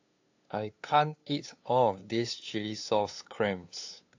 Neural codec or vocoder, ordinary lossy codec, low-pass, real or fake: codec, 16 kHz, 2 kbps, FunCodec, trained on LibriTTS, 25 frames a second; AAC, 48 kbps; 7.2 kHz; fake